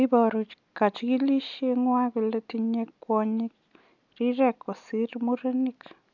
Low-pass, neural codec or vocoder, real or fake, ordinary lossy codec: 7.2 kHz; none; real; none